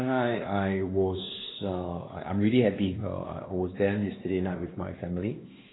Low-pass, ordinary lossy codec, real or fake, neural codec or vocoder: 7.2 kHz; AAC, 16 kbps; fake; codec, 16 kHz, 2 kbps, X-Codec, WavLM features, trained on Multilingual LibriSpeech